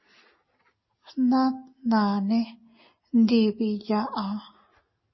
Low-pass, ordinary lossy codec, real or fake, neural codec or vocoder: 7.2 kHz; MP3, 24 kbps; real; none